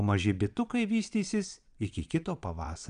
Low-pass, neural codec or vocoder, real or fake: 9.9 kHz; vocoder, 22.05 kHz, 80 mel bands, Vocos; fake